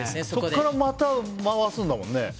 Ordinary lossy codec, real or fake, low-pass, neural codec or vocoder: none; real; none; none